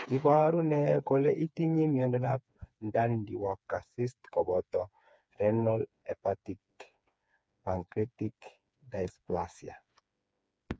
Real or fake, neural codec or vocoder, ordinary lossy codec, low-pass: fake; codec, 16 kHz, 4 kbps, FreqCodec, smaller model; none; none